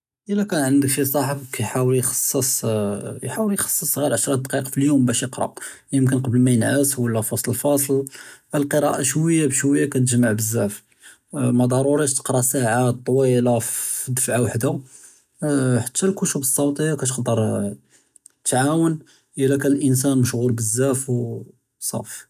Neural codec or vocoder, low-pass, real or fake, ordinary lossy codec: none; 14.4 kHz; real; none